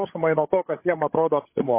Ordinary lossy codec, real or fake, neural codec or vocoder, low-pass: MP3, 24 kbps; fake; codec, 16 kHz, 16 kbps, FreqCodec, larger model; 3.6 kHz